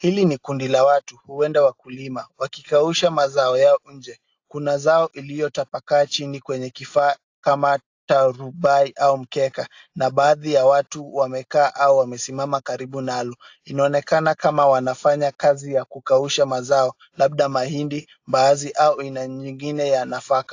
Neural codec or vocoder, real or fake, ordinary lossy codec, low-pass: none; real; AAC, 48 kbps; 7.2 kHz